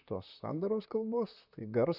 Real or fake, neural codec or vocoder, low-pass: fake; vocoder, 44.1 kHz, 128 mel bands, Pupu-Vocoder; 5.4 kHz